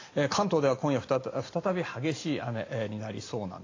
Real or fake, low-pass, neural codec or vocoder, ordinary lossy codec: real; 7.2 kHz; none; AAC, 32 kbps